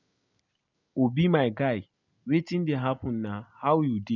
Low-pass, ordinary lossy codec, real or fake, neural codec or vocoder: 7.2 kHz; none; real; none